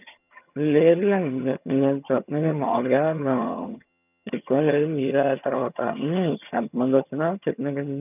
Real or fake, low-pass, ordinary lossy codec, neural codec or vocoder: fake; 3.6 kHz; none; vocoder, 22.05 kHz, 80 mel bands, HiFi-GAN